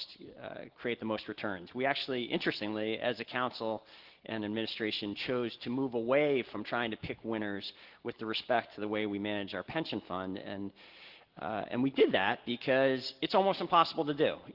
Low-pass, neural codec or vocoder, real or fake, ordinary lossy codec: 5.4 kHz; none; real; Opus, 16 kbps